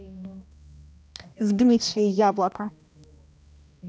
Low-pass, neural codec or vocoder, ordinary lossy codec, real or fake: none; codec, 16 kHz, 1 kbps, X-Codec, HuBERT features, trained on balanced general audio; none; fake